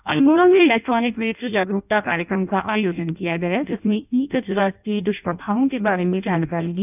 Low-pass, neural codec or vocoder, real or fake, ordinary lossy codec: 3.6 kHz; codec, 16 kHz in and 24 kHz out, 0.6 kbps, FireRedTTS-2 codec; fake; none